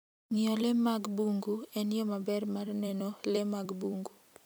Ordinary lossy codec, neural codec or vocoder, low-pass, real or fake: none; vocoder, 44.1 kHz, 128 mel bands every 256 samples, BigVGAN v2; none; fake